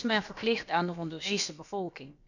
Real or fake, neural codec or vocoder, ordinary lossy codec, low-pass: fake; codec, 16 kHz, about 1 kbps, DyCAST, with the encoder's durations; none; 7.2 kHz